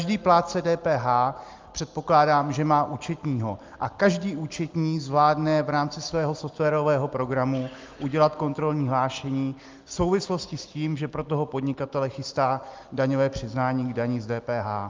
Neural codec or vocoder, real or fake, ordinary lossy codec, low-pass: none; real; Opus, 32 kbps; 7.2 kHz